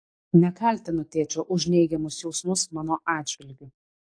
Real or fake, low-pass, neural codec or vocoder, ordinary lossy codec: fake; 9.9 kHz; codec, 44.1 kHz, 7.8 kbps, DAC; AAC, 48 kbps